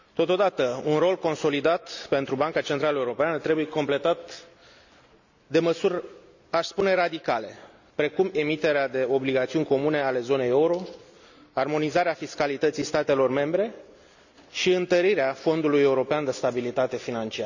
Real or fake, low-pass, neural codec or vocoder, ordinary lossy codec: real; 7.2 kHz; none; none